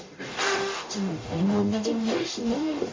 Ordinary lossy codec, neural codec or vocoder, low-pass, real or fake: MP3, 32 kbps; codec, 44.1 kHz, 0.9 kbps, DAC; 7.2 kHz; fake